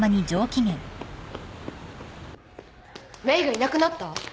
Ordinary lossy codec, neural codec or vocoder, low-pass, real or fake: none; none; none; real